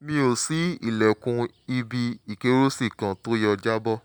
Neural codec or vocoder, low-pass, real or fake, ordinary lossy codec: none; none; real; none